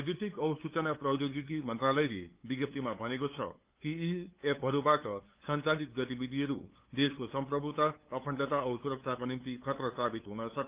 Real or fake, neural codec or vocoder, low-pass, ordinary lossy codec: fake; codec, 16 kHz, 8 kbps, FunCodec, trained on LibriTTS, 25 frames a second; 3.6 kHz; Opus, 32 kbps